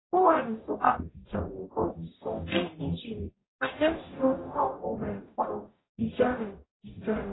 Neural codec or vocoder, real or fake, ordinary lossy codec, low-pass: codec, 44.1 kHz, 0.9 kbps, DAC; fake; AAC, 16 kbps; 7.2 kHz